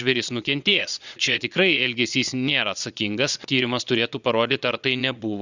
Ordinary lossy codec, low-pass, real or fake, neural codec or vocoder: Opus, 64 kbps; 7.2 kHz; fake; vocoder, 22.05 kHz, 80 mel bands, Vocos